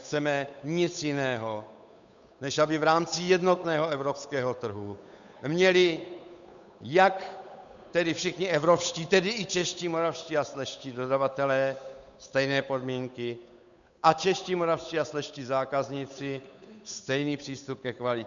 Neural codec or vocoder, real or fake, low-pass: codec, 16 kHz, 8 kbps, FunCodec, trained on Chinese and English, 25 frames a second; fake; 7.2 kHz